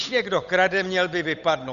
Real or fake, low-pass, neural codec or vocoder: fake; 7.2 kHz; codec, 16 kHz, 8 kbps, FunCodec, trained on Chinese and English, 25 frames a second